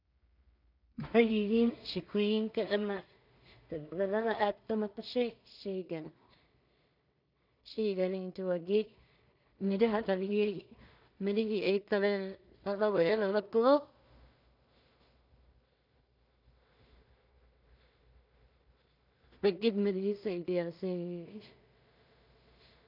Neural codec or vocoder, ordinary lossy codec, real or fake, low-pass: codec, 16 kHz in and 24 kHz out, 0.4 kbps, LongCat-Audio-Codec, two codebook decoder; none; fake; 5.4 kHz